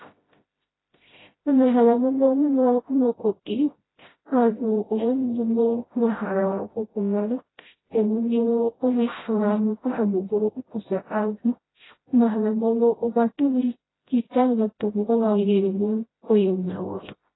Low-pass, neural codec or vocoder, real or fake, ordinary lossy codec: 7.2 kHz; codec, 16 kHz, 0.5 kbps, FreqCodec, smaller model; fake; AAC, 16 kbps